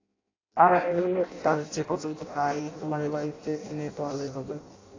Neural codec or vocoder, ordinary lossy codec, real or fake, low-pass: codec, 16 kHz in and 24 kHz out, 0.6 kbps, FireRedTTS-2 codec; AAC, 32 kbps; fake; 7.2 kHz